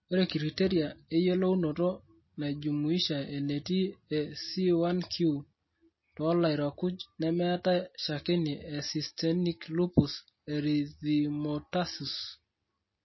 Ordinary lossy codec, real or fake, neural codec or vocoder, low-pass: MP3, 24 kbps; real; none; 7.2 kHz